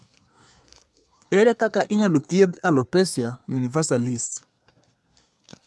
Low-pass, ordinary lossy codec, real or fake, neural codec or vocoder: none; none; fake; codec, 24 kHz, 1 kbps, SNAC